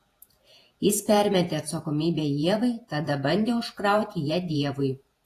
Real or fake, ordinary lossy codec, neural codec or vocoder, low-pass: fake; AAC, 48 kbps; vocoder, 48 kHz, 128 mel bands, Vocos; 14.4 kHz